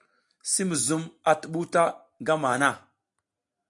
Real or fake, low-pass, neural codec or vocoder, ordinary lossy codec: real; 10.8 kHz; none; AAC, 64 kbps